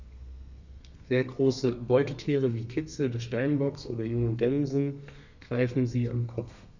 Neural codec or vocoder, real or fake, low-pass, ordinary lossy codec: codec, 32 kHz, 1.9 kbps, SNAC; fake; 7.2 kHz; none